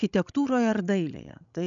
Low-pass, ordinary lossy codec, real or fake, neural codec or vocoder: 7.2 kHz; MP3, 96 kbps; fake; codec, 16 kHz, 16 kbps, FreqCodec, larger model